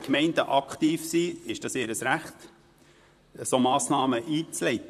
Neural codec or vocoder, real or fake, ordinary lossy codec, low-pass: vocoder, 44.1 kHz, 128 mel bands, Pupu-Vocoder; fake; none; 14.4 kHz